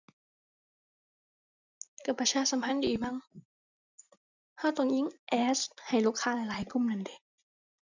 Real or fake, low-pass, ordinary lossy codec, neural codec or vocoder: real; 7.2 kHz; none; none